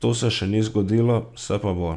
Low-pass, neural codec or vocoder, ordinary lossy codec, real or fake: 10.8 kHz; none; none; real